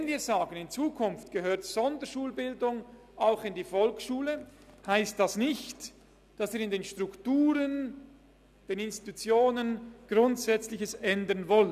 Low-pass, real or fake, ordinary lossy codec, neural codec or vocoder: 14.4 kHz; real; none; none